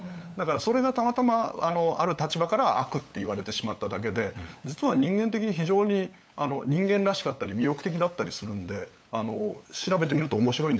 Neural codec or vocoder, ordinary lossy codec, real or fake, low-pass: codec, 16 kHz, 8 kbps, FunCodec, trained on LibriTTS, 25 frames a second; none; fake; none